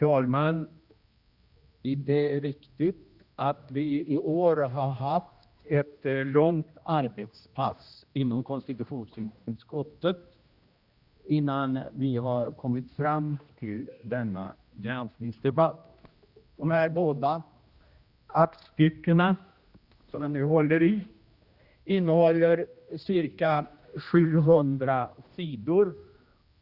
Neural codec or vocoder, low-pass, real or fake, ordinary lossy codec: codec, 16 kHz, 1 kbps, X-Codec, HuBERT features, trained on general audio; 5.4 kHz; fake; Opus, 64 kbps